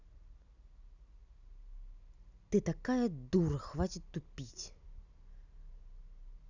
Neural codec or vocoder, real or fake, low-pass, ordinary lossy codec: none; real; 7.2 kHz; none